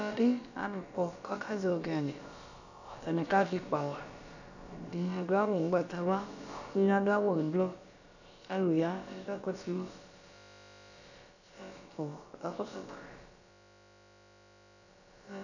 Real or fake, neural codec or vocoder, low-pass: fake; codec, 16 kHz, about 1 kbps, DyCAST, with the encoder's durations; 7.2 kHz